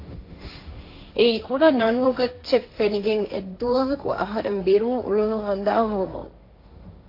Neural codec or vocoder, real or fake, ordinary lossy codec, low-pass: codec, 16 kHz, 1.1 kbps, Voila-Tokenizer; fake; AAC, 32 kbps; 5.4 kHz